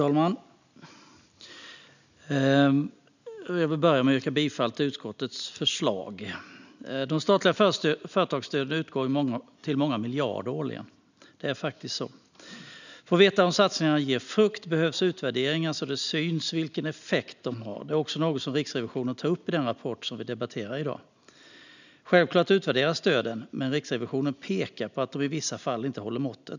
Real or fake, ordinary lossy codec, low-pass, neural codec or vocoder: real; none; 7.2 kHz; none